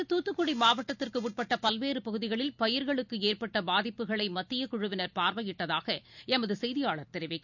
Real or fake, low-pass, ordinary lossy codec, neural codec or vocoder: real; 7.2 kHz; none; none